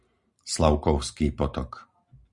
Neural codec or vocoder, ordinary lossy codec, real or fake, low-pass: none; Opus, 64 kbps; real; 10.8 kHz